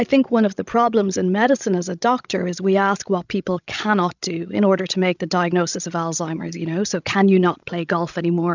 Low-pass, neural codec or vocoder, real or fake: 7.2 kHz; codec, 16 kHz, 16 kbps, FreqCodec, larger model; fake